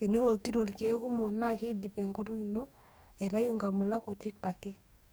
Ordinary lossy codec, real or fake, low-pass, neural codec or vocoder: none; fake; none; codec, 44.1 kHz, 2.6 kbps, DAC